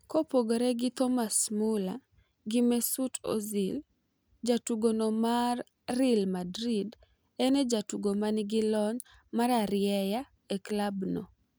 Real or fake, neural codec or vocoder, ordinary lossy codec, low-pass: real; none; none; none